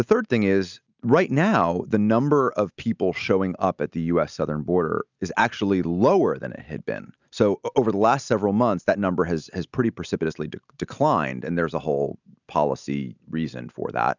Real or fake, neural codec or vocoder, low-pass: real; none; 7.2 kHz